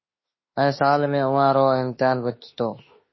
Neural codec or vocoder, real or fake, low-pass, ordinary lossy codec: autoencoder, 48 kHz, 32 numbers a frame, DAC-VAE, trained on Japanese speech; fake; 7.2 kHz; MP3, 24 kbps